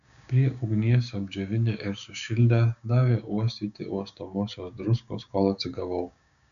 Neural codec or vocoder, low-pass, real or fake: codec, 16 kHz, 6 kbps, DAC; 7.2 kHz; fake